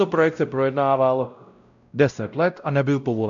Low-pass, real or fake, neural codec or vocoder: 7.2 kHz; fake; codec, 16 kHz, 0.5 kbps, X-Codec, WavLM features, trained on Multilingual LibriSpeech